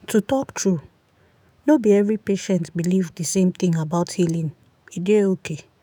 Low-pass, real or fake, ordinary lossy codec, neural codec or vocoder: none; fake; none; autoencoder, 48 kHz, 128 numbers a frame, DAC-VAE, trained on Japanese speech